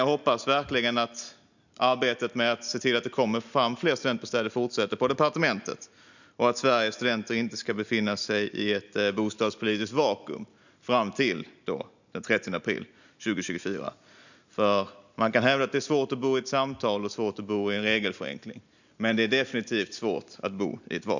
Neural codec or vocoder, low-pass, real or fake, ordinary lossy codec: none; 7.2 kHz; real; none